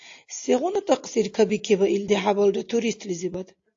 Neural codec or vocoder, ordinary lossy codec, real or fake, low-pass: none; AAC, 48 kbps; real; 7.2 kHz